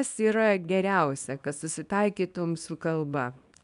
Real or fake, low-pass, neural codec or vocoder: fake; 10.8 kHz; codec, 24 kHz, 0.9 kbps, WavTokenizer, small release